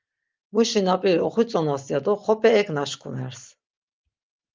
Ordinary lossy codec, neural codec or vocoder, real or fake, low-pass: Opus, 32 kbps; none; real; 7.2 kHz